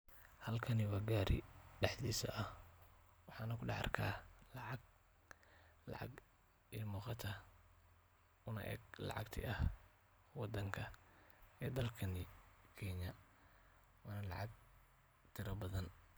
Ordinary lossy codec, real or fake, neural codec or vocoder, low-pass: none; real; none; none